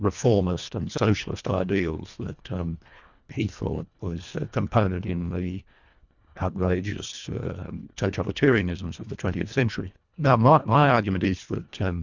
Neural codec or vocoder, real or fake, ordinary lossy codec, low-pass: codec, 24 kHz, 1.5 kbps, HILCodec; fake; Opus, 64 kbps; 7.2 kHz